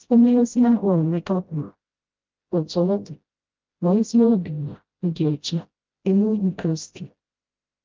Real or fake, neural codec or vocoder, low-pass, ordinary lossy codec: fake; codec, 16 kHz, 0.5 kbps, FreqCodec, smaller model; 7.2 kHz; Opus, 32 kbps